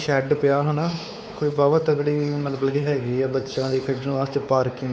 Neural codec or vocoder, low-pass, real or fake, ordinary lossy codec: codec, 16 kHz, 4 kbps, X-Codec, WavLM features, trained on Multilingual LibriSpeech; none; fake; none